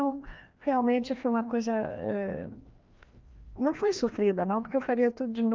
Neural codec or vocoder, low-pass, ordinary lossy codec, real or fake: codec, 16 kHz, 1 kbps, FreqCodec, larger model; 7.2 kHz; Opus, 32 kbps; fake